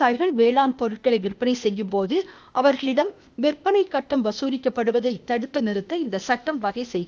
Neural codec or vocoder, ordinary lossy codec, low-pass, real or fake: codec, 16 kHz, 0.8 kbps, ZipCodec; none; none; fake